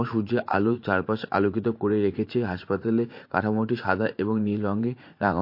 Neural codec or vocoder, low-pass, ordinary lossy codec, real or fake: vocoder, 44.1 kHz, 80 mel bands, Vocos; 5.4 kHz; MP3, 32 kbps; fake